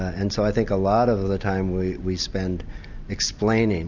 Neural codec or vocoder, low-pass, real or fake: none; 7.2 kHz; real